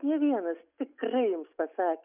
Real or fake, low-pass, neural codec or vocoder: real; 3.6 kHz; none